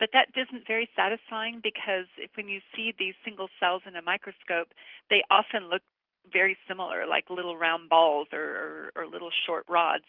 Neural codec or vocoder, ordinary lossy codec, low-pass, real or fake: none; Opus, 32 kbps; 5.4 kHz; real